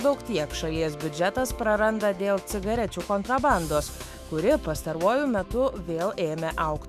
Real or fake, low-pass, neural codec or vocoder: fake; 14.4 kHz; autoencoder, 48 kHz, 128 numbers a frame, DAC-VAE, trained on Japanese speech